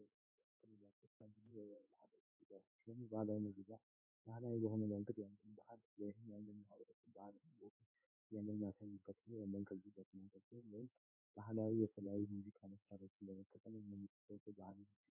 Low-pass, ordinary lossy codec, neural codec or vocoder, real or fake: 3.6 kHz; MP3, 24 kbps; codec, 16 kHz in and 24 kHz out, 1 kbps, XY-Tokenizer; fake